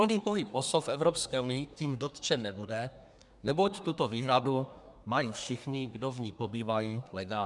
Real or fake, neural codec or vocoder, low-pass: fake; codec, 24 kHz, 1 kbps, SNAC; 10.8 kHz